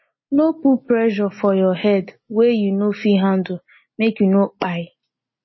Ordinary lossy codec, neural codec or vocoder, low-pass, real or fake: MP3, 24 kbps; none; 7.2 kHz; real